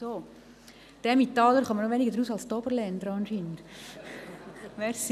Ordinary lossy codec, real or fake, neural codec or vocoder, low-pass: none; real; none; 14.4 kHz